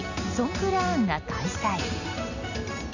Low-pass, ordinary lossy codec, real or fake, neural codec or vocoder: 7.2 kHz; none; real; none